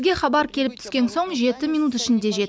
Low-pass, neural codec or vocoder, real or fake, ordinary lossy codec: none; none; real; none